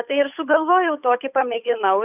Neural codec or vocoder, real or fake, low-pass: codec, 16 kHz, 8 kbps, FunCodec, trained on Chinese and English, 25 frames a second; fake; 3.6 kHz